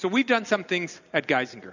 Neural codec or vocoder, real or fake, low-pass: none; real; 7.2 kHz